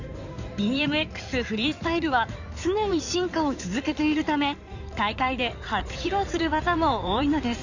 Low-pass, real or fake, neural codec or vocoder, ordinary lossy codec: 7.2 kHz; fake; codec, 16 kHz in and 24 kHz out, 2.2 kbps, FireRedTTS-2 codec; none